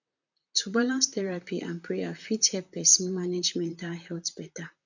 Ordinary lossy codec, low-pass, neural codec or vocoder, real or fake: none; 7.2 kHz; vocoder, 44.1 kHz, 128 mel bands, Pupu-Vocoder; fake